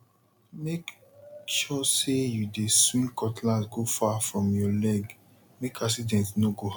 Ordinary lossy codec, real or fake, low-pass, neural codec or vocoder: none; real; none; none